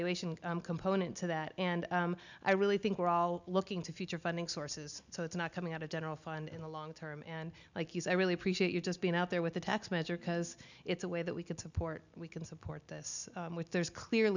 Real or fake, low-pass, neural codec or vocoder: real; 7.2 kHz; none